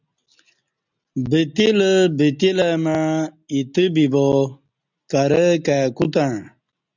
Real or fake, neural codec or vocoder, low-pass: real; none; 7.2 kHz